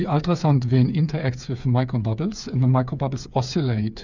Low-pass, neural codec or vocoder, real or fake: 7.2 kHz; codec, 16 kHz, 8 kbps, FreqCodec, smaller model; fake